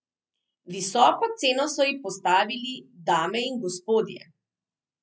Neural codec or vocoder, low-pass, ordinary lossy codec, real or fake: none; none; none; real